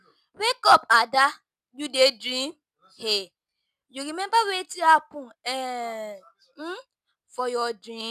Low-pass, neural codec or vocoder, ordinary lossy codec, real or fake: 14.4 kHz; none; none; real